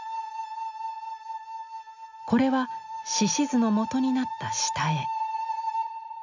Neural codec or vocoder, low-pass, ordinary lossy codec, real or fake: none; 7.2 kHz; none; real